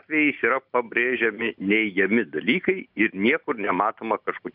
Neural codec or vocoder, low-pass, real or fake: none; 5.4 kHz; real